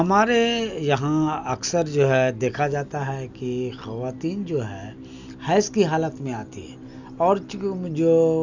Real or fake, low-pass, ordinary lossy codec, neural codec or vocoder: real; 7.2 kHz; none; none